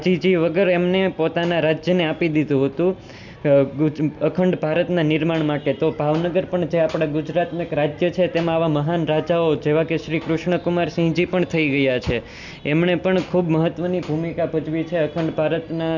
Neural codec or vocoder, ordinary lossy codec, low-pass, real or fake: none; none; 7.2 kHz; real